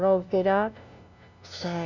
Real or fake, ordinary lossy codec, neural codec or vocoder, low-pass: fake; none; codec, 16 kHz, 0.5 kbps, FunCodec, trained on LibriTTS, 25 frames a second; 7.2 kHz